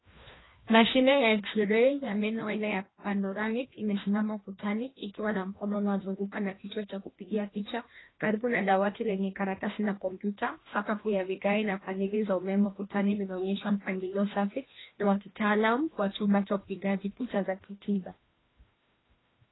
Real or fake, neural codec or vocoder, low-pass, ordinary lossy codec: fake; codec, 16 kHz, 1 kbps, FreqCodec, larger model; 7.2 kHz; AAC, 16 kbps